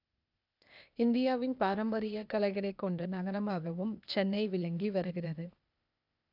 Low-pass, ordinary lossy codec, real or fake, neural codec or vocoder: 5.4 kHz; none; fake; codec, 16 kHz, 0.8 kbps, ZipCodec